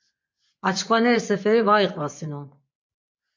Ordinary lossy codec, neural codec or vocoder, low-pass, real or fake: MP3, 48 kbps; vocoder, 24 kHz, 100 mel bands, Vocos; 7.2 kHz; fake